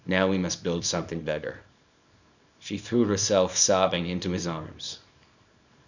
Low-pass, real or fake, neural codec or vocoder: 7.2 kHz; fake; codec, 24 kHz, 0.9 kbps, WavTokenizer, small release